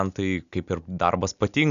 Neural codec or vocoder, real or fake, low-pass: none; real; 7.2 kHz